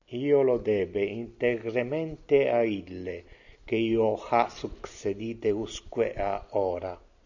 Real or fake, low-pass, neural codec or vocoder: real; 7.2 kHz; none